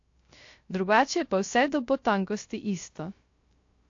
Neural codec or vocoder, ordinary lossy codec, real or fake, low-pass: codec, 16 kHz, 0.3 kbps, FocalCodec; AAC, 48 kbps; fake; 7.2 kHz